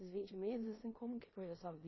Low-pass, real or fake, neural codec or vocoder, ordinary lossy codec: 7.2 kHz; fake; codec, 16 kHz in and 24 kHz out, 0.9 kbps, LongCat-Audio-Codec, four codebook decoder; MP3, 24 kbps